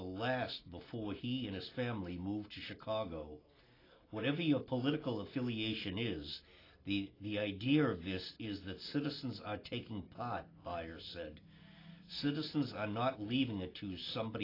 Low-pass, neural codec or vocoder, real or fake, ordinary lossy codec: 5.4 kHz; none; real; AAC, 24 kbps